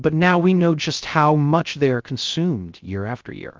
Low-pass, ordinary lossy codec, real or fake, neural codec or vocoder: 7.2 kHz; Opus, 32 kbps; fake; codec, 16 kHz, 0.3 kbps, FocalCodec